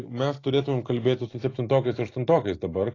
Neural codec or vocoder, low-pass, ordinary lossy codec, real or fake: none; 7.2 kHz; AAC, 32 kbps; real